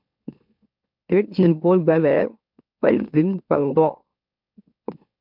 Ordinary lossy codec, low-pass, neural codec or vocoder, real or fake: MP3, 48 kbps; 5.4 kHz; autoencoder, 44.1 kHz, a latent of 192 numbers a frame, MeloTTS; fake